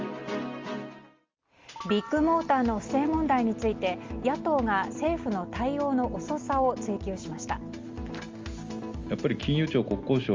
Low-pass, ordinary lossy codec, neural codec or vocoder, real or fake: 7.2 kHz; Opus, 32 kbps; none; real